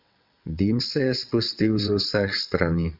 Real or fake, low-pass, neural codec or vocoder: fake; 5.4 kHz; vocoder, 22.05 kHz, 80 mel bands, WaveNeXt